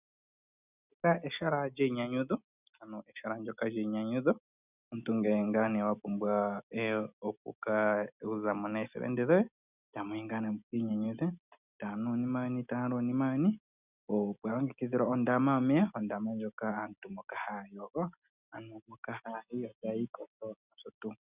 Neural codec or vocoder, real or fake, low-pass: none; real; 3.6 kHz